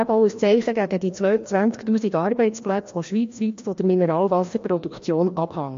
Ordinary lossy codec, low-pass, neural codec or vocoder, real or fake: MP3, 48 kbps; 7.2 kHz; codec, 16 kHz, 1 kbps, FreqCodec, larger model; fake